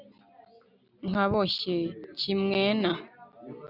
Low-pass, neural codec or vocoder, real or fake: 5.4 kHz; none; real